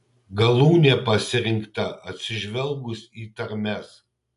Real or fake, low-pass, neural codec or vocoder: real; 10.8 kHz; none